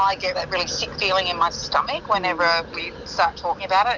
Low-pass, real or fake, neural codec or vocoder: 7.2 kHz; real; none